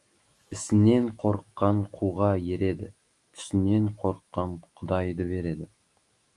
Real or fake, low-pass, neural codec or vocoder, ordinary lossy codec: fake; 10.8 kHz; autoencoder, 48 kHz, 128 numbers a frame, DAC-VAE, trained on Japanese speech; Opus, 32 kbps